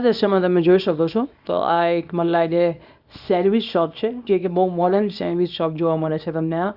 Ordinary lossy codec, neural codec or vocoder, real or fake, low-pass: Opus, 64 kbps; codec, 24 kHz, 0.9 kbps, WavTokenizer, small release; fake; 5.4 kHz